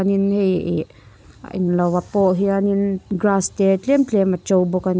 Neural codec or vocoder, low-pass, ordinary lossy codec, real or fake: none; none; none; real